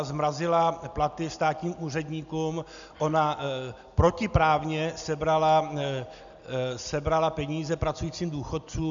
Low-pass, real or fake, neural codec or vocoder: 7.2 kHz; real; none